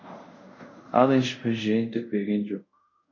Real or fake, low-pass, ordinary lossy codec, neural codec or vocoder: fake; 7.2 kHz; MP3, 48 kbps; codec, 24 kHz, 0.5 kbps, DualCodec